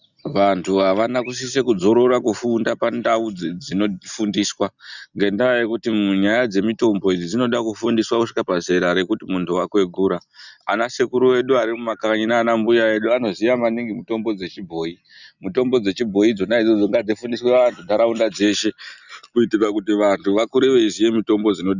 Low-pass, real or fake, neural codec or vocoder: 7.2 kHz; real; none